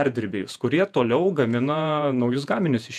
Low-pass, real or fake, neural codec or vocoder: 14.4 kHz; fake; vocoder, 48 kHz, 128 mel bands, Vocos